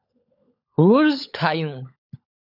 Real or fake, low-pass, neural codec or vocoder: fake; 5.4 kHz; codec, 16 kHz, 16 kbps, FunCodec, trained on LibriTTS, 50 frames a second